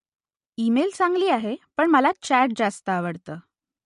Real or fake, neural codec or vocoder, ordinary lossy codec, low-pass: real; none; MP3, 48 kbps; 14.4 kHz